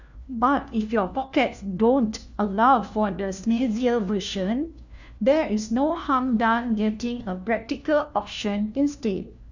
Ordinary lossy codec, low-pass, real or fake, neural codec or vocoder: none; 7.2 kHz; fake; codec, 16 kHz, 1 kbps, FunCodec, trained on LibriTTS, 50 frames a second